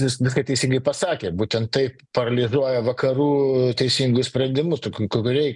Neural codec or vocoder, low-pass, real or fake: none; 10.8 kHz; real